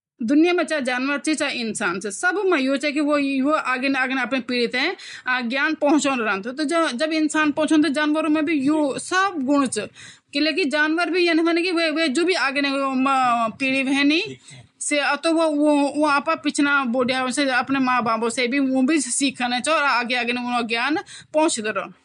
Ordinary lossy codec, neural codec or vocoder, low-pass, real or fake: MP3, 64 kbps; none; 10.8 kHz; real